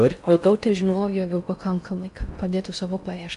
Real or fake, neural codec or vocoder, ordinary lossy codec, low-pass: fake; codec, 16 kHz in and 24 kHz out, 0.6 kbps, FocalCodec, streaming, 4096 codes; MP3, 64 kbps; 10.8 kHz